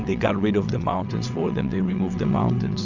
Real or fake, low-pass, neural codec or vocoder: fake; 7.2 kHz; vocoder, 22.05 kHz, 80 mel bands, WaveNeXt